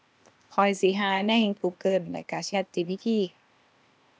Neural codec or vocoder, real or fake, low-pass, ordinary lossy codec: codec, 16 kHz, 0.8 kbps, ZipCodec; fake; none; none